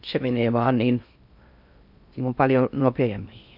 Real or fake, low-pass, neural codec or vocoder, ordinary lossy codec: fake; 5.4 kHz; codec, 16 kHz in and 24 kHz out, 0.6 kbps, FocalCodec, streaming, 4096 codes; none